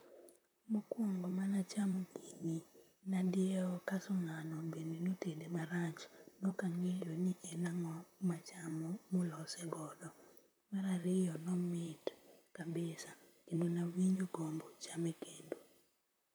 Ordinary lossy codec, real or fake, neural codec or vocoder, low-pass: none; fake; vocoder, 44.1 kHz, 128 mel bands, Pupu-Vocoder; none